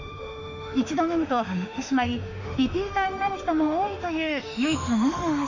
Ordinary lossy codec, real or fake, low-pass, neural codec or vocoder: none; fake; 7.2 kHz; autoencoder, 48 kHz, 32 numbers a frame, DAC-VAE, trained on Japanese speech